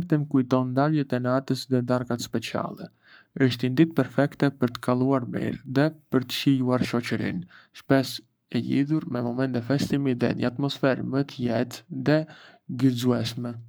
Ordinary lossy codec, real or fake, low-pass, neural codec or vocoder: none; fake; none; codec, 44.1 kHz, 7.8 kbps, Pupu-Codec